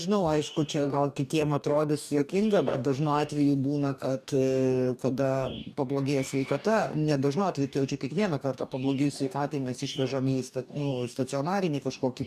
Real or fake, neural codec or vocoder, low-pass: fake; codec, 44.1 kHz, 2.6 kbps, DAC; 14.4 kHz